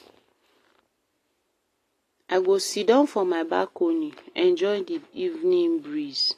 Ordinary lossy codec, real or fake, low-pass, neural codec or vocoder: AAC, 48 kbps; real; 14.4 kHz; none